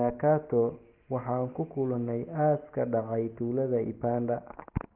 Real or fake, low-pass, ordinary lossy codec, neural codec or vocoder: real; 3.6 kHz; Opus, 16 kbps; none